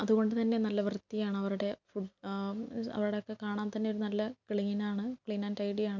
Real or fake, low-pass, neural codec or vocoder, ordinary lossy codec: real; 7.2 kHz; none; none